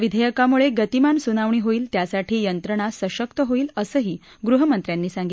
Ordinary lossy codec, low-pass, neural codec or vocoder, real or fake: none; none; none; real